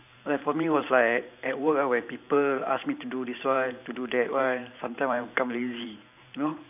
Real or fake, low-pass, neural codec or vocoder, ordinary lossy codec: fake; 3.6 kHz; vocoder, 44.1 kHz, 128 mel bands every 512 samples, BigVGAN v2; none